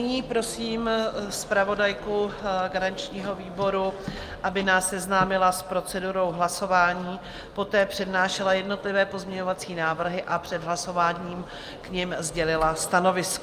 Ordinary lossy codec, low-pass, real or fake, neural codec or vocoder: Opus, 32 kbps; 14.4 kHz; real; none